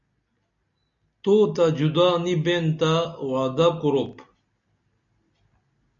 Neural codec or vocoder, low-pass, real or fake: none; 7.2 kHz; real